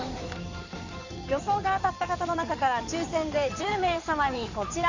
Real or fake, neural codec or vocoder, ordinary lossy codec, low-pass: fake; codec, 16 kHz in and 24 kHz out, 2.2 kbps, FireRedTTS-2 codec; none; 7.2 kHz